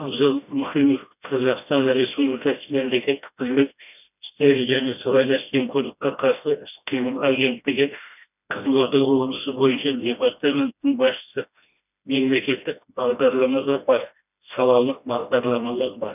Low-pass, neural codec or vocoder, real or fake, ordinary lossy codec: 3.6 kHz; codec, 16 kHz, 1 kbps, FreqCodec, smaller model; fake; none